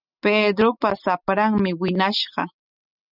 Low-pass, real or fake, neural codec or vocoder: 5.4 kHz; real; none